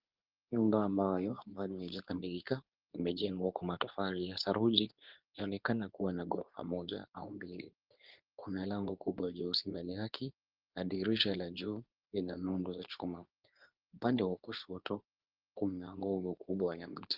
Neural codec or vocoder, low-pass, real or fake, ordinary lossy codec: codec, 24 kHz, 0.9 kbps, WavTokenizer, medium speech release version 2; 5.4 kHz; fake; Opus, 32 kbps